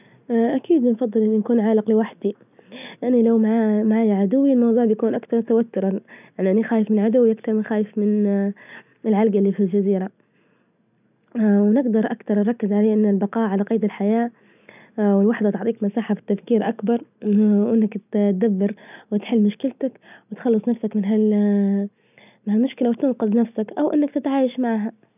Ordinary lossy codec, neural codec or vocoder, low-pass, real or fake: none; none; 3.6 kHz; real